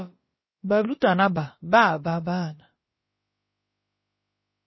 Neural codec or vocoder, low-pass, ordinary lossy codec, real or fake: codec, 16 kHz, about 1 kbps, DyCAST, with the encoder's durations; 7.2 kHz; MP3, 24 kbps; fake